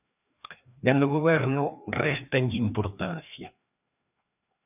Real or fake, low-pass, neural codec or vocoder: fake; 3.6 kHz; codec, 16 kHz, 2 kbps, FreqCodec, larger model